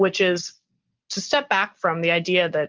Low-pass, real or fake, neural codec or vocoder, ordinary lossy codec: 7.2 kHz; real; none; Opus, 24 kbps